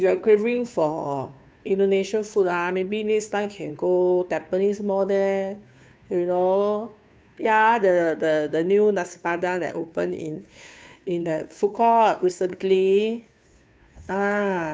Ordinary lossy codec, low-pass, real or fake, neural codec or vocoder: none; none; fake; codec, 16 kHz, 2 kbps, FunCodec, trained on Chinese and English, 25 frames a second